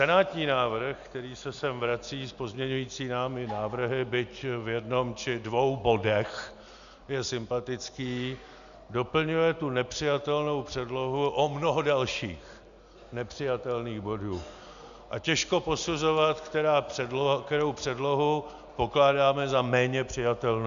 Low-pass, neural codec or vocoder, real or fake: 7.2 kHz; none; real